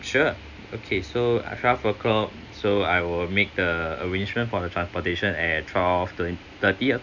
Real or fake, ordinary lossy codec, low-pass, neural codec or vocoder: real; none; 7.2 kHz; none